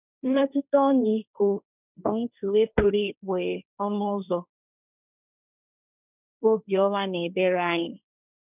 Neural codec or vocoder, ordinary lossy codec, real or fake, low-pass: codec, 16 kHz, 1.1 kbps, Voila-Tokenizer; none; fake; 3.6 kHz